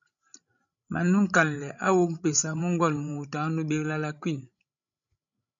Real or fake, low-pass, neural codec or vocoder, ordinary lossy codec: fake; 7.2 kHz; codec, 16 kHz, 16 kbps, FreqCodec, larger model; MP3, 96 kbps